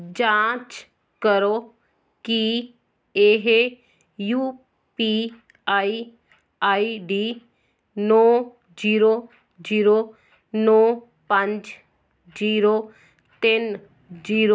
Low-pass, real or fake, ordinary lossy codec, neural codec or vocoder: none; real; none; none